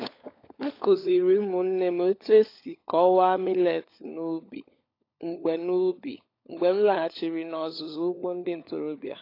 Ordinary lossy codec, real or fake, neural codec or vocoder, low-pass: AAC, 32 kbps; fake; codec, 16 kHz, 16 kbps, FunCodec, trained on LibriTTS, 50 frames a second; 5.4 kHz